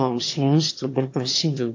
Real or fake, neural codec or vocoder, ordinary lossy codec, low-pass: fake; autoencoder, 22.05 kHz, a latent of 192 numbers a frame, VITS, trained on one speaker; AAC, 48 kbps; 7.2 kHz